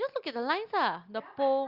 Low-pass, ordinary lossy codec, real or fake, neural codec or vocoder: 5.4 kHz; Opus, 32 kbps; real; none